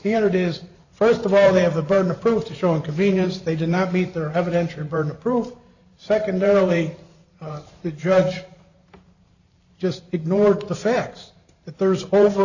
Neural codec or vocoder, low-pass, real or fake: vocoder, 44.1 kHz, 128 mel bands every 512 samples, BigVGAN v2; 7.2 kHz; fake